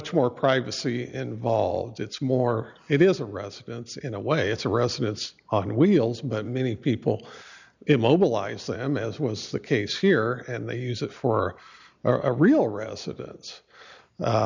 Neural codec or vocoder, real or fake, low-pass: none; real; 7.2 kHz